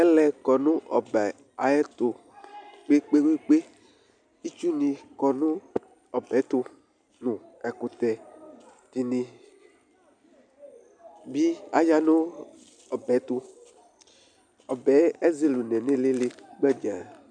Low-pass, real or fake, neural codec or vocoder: 9.9 kHz; real; none